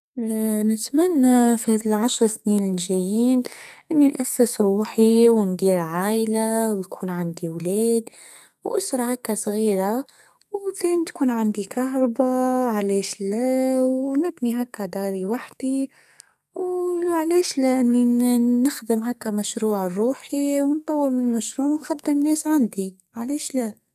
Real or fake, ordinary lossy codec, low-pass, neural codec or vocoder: fake; none; 14.4 kHz; codec, 44.1 kHz, 2.6 kbps, SNAC